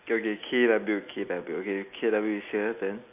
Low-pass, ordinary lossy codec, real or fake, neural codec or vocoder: 3.6 kHz; none; real; none